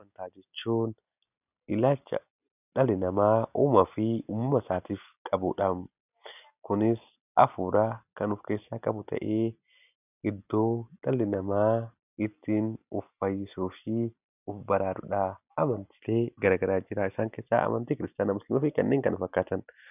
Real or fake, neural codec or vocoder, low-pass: real; none; 3.6 kHz